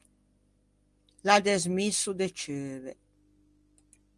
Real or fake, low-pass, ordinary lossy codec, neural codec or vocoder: real; 10.8 kHz; Opus, 24 kbps; none